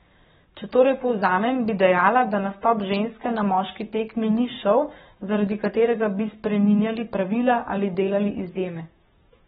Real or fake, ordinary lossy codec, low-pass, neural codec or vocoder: fake; AAC, 16 kbps; 19.8 kHz; codec, 44.1 kHz, 7.8 kbps, Pupu-Codec